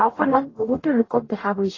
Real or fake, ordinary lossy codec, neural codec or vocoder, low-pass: fake; AAC, 32 kbps; codec, 44.1 kHz, 0.9 kbps, DAC; 7.2 kHz